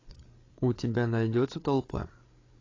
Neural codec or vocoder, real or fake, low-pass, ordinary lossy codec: codec, 16 kHz, 8 kbps, FreqCodec, larger model; fake; 7.2 kHz; AAC, 32 kbps